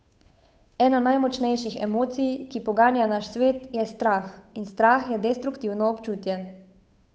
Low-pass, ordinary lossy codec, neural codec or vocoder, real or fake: none; none; codec, 16 kHz, 8 kbps, FunCodec, trained on Chinese and English, 25 frames a second; fake